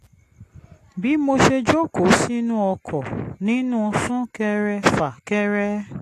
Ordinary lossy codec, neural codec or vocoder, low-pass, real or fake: AAC, 48 kbps; none; 14.4 kHz; real